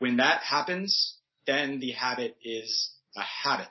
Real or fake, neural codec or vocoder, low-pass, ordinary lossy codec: real; none; 7.2 kHz; MP3, 24 kbps